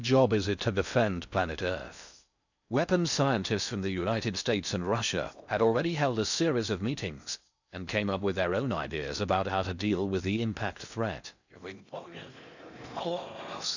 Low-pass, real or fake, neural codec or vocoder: 7.2 kHz; fake; codec, 16 kHz in and 24 kHz out, 0.6 kbps, FocalCodec, streaming, 2048 codes